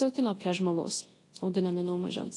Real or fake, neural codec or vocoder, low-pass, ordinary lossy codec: fake; codec, 24 kHz, 0.9 kbps, WavTokenizer, large speech release; 10.8 kHz; AAC, 32 kbps